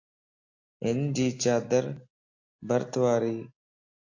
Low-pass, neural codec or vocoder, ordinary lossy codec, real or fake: 7.2 kHz; none; AAC, 48 kbps; real